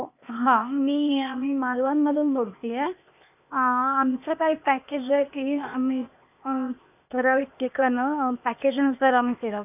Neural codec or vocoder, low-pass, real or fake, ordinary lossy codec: codec, 16 kHz, 0.8 kbps, ZipCodec; 3.6 kHz; fake; none